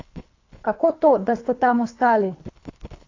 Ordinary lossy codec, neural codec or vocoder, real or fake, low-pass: AAC, 48 kbps; codec, 24 kHz, 3 kbps, HILCodec; fake; 7.2 kHz